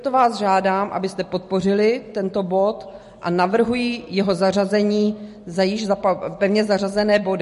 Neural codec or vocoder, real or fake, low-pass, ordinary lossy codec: none; real; 14.4 kHz; MP3, 48 kbps